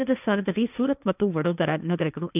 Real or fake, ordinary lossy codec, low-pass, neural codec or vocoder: fake; none; 3.6 kHz; codec, 16 kHz, 1.1 kbps, Voila-Tokenizer